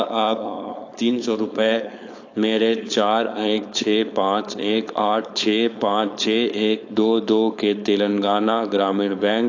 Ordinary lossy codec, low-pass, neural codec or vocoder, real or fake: AAC, 48 kbps; 7.2 kHz; codec, 16 kHz, 4.8 kbps, FACodec; fake